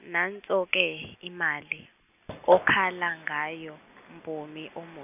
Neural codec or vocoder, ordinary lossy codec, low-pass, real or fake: none; none; 3.6 kHz; real